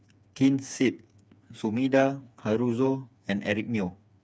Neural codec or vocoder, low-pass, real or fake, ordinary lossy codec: codec, 16 kHz, 4 kbps, FreqCodec, smaller model; none; fake; none